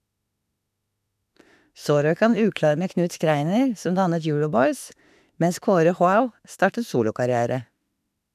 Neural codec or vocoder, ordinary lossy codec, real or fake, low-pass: autoencoder, 48 kHz, 32 numbers a frame, DAC-VAE, trained on Japanese speech; none; fake; 14.4 kHz